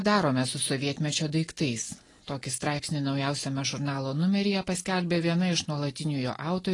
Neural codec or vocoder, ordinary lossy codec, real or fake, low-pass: none; AAC, 32 kbps; real; 10.8 kHz